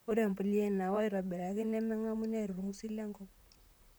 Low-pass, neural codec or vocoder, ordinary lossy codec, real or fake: none; vocoder, 44.1 kHz, 128 mel bands, Pupu-Vocoder; none; fake